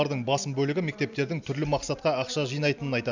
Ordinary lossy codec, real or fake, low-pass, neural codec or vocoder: none; real; 7.2 kHz; none